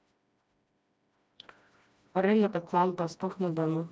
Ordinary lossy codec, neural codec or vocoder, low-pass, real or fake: none; codec, 16 kHz, 1 kbps, FreqCodec, smaller model; none; fake